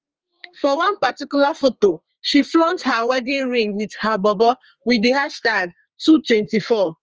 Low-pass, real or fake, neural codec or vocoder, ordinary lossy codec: 7.2 kHz; fake; codec, 32 kHz, 1.9 kbps, SNAC; Opus, 24 kbps